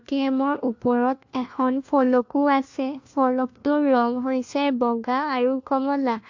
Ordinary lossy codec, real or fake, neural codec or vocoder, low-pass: AAC, 48 kbps; fake; codec, 16 kHz, 1 kbps, FunCodec, trained on LibriTTS, 50 frames a second; 7.2 kHz